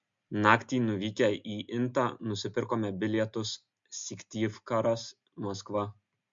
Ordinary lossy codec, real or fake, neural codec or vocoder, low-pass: MP3, 48 kbps; real; none; 7.2 kHz